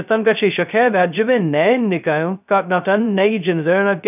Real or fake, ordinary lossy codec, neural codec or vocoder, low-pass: fake; none; codec, 16 kHz, 0.2 kbps, FocalCodec; 3.6 kHz